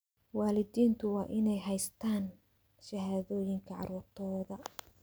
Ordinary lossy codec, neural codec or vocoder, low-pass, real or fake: none; none; none; real